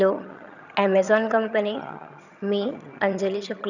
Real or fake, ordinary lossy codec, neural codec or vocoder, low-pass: fake; none; vocoder, 22.05 kHz, 80 mel bands, HiFi-GAN; 7.2 kHz